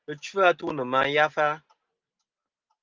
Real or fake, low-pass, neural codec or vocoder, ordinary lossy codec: real; 7.2 kHz; none; Opus, 32 kbps